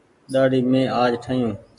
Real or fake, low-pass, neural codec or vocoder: real; 10.8 kHz; none